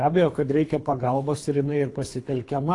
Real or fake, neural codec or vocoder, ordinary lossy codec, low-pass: fake; codec, 24 kHz, 3 kbps, HILCodec; AAC, 48 kbps; 10.8 kHz